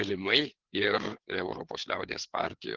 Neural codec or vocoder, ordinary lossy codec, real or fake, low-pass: codec, 16 kHz, 4 kbps, FreqCodec, larger model; Opus, 16 kbps; fake; 7.2 kHz